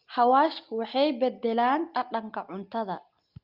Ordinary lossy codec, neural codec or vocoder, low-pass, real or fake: Opus, 24 kbps; none; 5.4 kHz; real